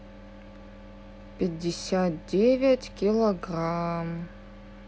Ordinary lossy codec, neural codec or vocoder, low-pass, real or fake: none; none; none; real